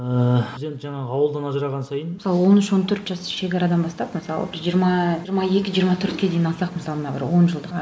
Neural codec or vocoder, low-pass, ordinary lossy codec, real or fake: none; none; none; real